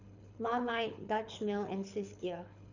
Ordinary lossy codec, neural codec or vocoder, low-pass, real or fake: none; codec, 24 kHz, 6 kbps, HILCodec; 7.2 kHz; fake